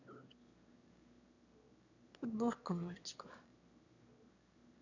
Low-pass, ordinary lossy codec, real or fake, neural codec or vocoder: 7.2 kHz; none; fake; autoencoder, 22.05 kHz, a latent of 192 numbers a frame, VITS, trained on one speaker